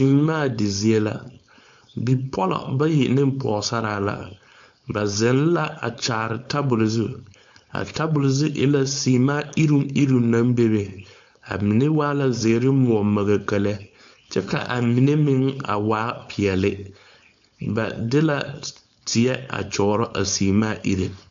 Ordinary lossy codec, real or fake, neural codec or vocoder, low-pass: AAC, 64 kbps; fake; codec, 16 kHz, 4.8 kbps, FACodec; 7.2 kHz